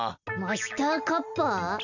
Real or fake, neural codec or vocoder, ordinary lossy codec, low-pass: real; none; none; 7.2 kHz